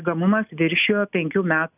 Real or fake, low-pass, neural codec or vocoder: real; 3.6 kHz; none